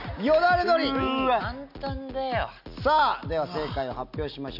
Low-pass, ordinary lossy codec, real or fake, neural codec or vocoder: 5.4 kHz; none; real; none